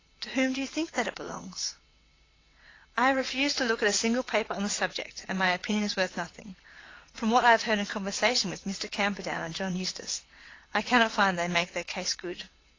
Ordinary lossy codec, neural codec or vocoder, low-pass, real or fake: AAC, 32 kbps; vocoder, 22.05 kHz, 80 mel bands, WaveNeXt; 7.2 kHz; fake